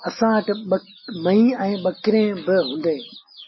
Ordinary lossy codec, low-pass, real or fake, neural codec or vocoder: MP3, 24 kbps; 7.2 kHz; real; none